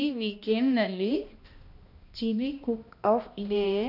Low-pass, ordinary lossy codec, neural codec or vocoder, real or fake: 5.4 kHz; none; codec, 16 kHz, 1 kbps, X-Codec, HuBERT features, trained on balanced general audio; fake